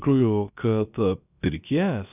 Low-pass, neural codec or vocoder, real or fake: 3.6 kHz; codec, 16 kHz, about 1 kbps, DyCAST, with the encoder's durations; fake